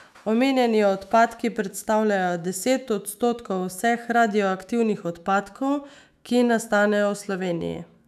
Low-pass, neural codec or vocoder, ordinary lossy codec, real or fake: 14.4 kHz; autoencoder, 48 kHz, 128 numbers a frame, DAC-VAE, trained on Japanese speech; none; fake